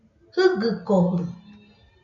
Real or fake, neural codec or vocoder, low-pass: real; none; 7.2 kHz